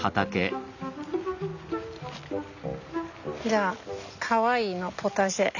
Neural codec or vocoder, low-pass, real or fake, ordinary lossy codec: none; 7.2 kHz; real; none